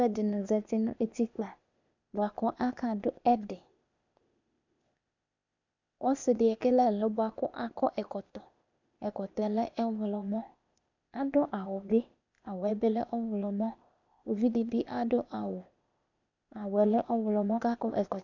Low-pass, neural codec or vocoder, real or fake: 7.2 kHz; codec, 16 kHz, 0.8 kbps, ZipCodec; fake